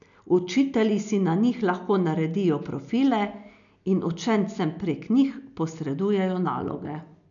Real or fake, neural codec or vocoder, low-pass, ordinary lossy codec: real; none; 7.2 kHz; none